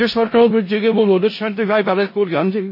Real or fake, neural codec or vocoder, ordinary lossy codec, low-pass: fake; codec, 16 kHz in and 24 kHz out, 0.4 kbps, LongCat-Audio-Codec, four codebook decoder; MP3, 24 kbps; 5.4 kHz